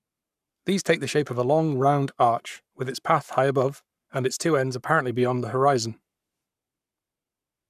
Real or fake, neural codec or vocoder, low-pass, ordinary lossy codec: fake; codec, 44.1 kHz, 7.8 kbps, Pupu-Codec; 14.4 kHz; none